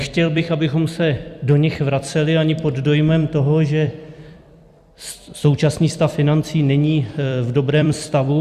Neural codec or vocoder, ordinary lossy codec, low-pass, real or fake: vocoder, 44.1 kHz, 128 mel bands every 256 samples, BigVGAN v2; Opus, 64 kbps; 14.4 kHz; fake